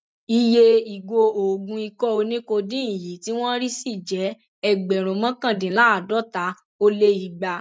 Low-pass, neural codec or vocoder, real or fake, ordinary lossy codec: none; none; real; none